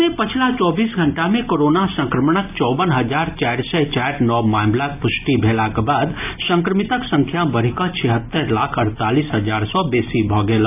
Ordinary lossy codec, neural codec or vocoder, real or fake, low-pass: AAC, 32 kbps; none; real; 3.6 kHz